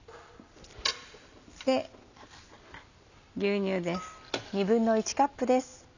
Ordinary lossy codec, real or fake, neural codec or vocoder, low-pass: none; real; none; 7.2 kHz